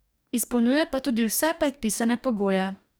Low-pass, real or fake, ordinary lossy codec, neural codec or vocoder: none; fake; none; codec, 44.1 kHz, 2.6 kbps, DAC